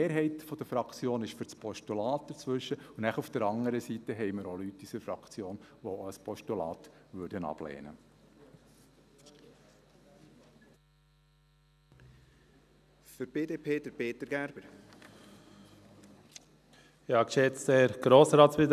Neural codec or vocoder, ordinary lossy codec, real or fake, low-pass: none; none; real; 14.4 kHz